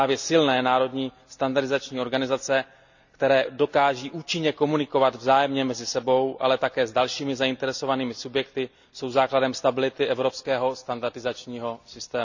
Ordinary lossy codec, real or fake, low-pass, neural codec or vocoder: none; real; 7.2 kHz; none